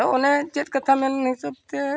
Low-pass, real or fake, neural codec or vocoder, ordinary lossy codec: none; real; none; none